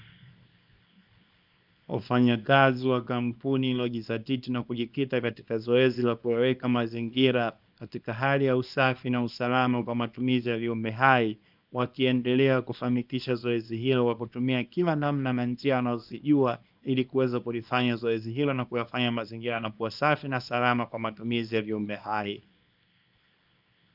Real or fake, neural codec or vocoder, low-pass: fake; codec, 24 kHz, 0.9 kbps, WavTokenizer, small release; 5.4 kHz